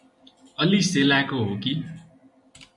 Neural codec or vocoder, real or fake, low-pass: none; real; 10.8 kHz